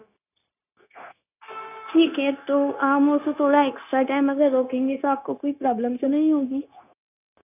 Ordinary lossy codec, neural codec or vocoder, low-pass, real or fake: none; codec, 16 kHz, 0.9 kbps, LongCat-Audio-Codec; 3.6 kHz; fake